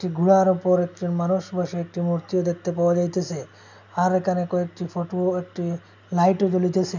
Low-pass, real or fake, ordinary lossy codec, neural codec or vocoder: 7.2 kHz; real; none; none